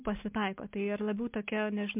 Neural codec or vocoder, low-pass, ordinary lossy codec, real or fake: none; 3.6 kHz; MP3, 32 kbps; real